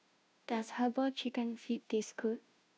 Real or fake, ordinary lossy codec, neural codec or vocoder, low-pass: fake; none; codec, 16 kHz, 0.5 kbps, FunCodec, trained on Chinese and English, 25 frames a second; none